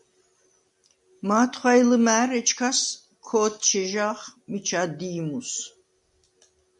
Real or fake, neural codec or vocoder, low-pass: real; none; 10.8 kHz